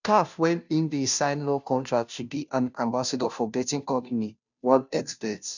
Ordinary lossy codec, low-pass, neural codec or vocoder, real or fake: none; 7.2 kHz; codec, 16 kHz, 0.5 kbps, FunCodec, trained on Chinese and English, 25 frames a second; fake